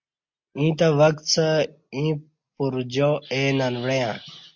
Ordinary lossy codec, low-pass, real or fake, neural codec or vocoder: MP3, 48 kbps; 7.2 kHz; real; none